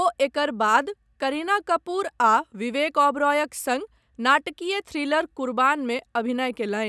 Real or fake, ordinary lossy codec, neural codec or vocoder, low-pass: real; none; none; none